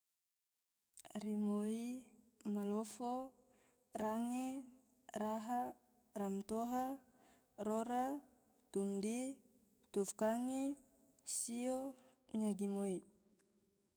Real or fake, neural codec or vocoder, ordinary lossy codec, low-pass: fake; codec, 44.1 kHz, 7.8 kbps, DAC; none; none